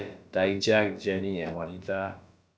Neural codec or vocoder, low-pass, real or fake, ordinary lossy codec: codec, 16 kHz, about 1 kbps, DyCAST, with the encoder's durations; none; fake; none